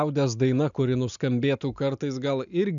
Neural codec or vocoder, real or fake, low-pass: none; real; 7.2 kHz